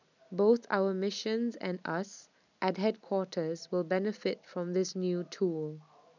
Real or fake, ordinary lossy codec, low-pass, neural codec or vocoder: real; none; 7.2 kHz; none